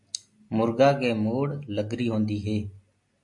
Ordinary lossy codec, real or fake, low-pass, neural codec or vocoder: MP3, 48 kbps; real; 10.8 kHz; none